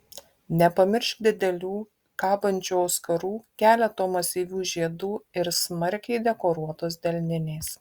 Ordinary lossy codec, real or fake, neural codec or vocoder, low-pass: Opus, 64 kbps; real; none; 19.8 kHz